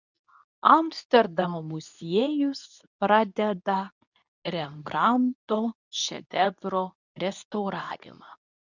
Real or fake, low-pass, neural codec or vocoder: fake; 7.2 kHz; codec, 24 kHz, 0.9 kbps, WavTokenizer, medium speech release version 2